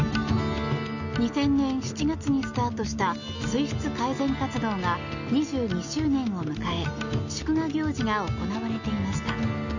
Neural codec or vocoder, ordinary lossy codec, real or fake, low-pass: none; none; real; 7.2 kHz